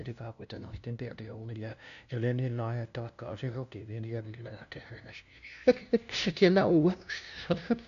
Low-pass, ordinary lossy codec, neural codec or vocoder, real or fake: 7.2 kHz; none; codec, 16 kHz, 0.5 kbps, FunCodec, trained on LibriTTS, 25 frames a second; fake